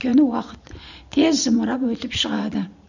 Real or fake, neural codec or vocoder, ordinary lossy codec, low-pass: fake; vocoder, 44.1 kHz, 128 mel bands every 512 samples, BigVGAN v2; none; 7.2 kHz